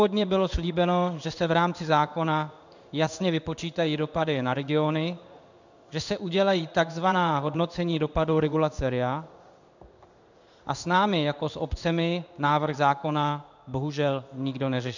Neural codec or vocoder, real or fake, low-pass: codec, 16 kHz in and 24 kHz out, 1 kbps, XY-Tokenizer; fake; 7.2 kHz